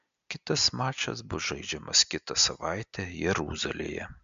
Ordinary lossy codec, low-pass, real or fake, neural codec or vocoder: MP3, 64 kbps; 7.2 kHz; real; none